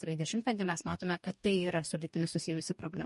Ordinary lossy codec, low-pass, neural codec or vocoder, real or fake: MP3, 48 kbps; 14.4 kHz; codec, 44.1 kHz, 2.6 kbps, DAC; fake